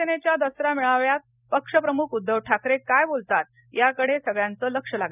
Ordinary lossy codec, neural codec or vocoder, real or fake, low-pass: none; none; real; 3.6 kHz